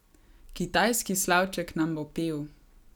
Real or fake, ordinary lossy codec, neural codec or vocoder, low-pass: real; none; none; none